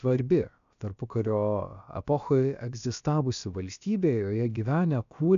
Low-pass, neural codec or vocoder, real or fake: 7.2 kHz; codec, 16 kHz, about 1 kbps, DyCAST, with the encoder's durations; fake